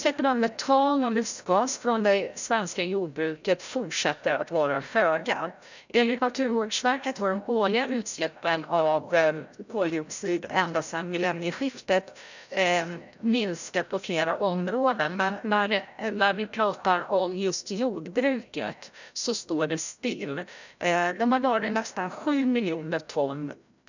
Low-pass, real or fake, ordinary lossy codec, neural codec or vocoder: 7.2 kHz; fake; none; codec, 16 kHz, 0.5 kbps, FreqCodec, larger model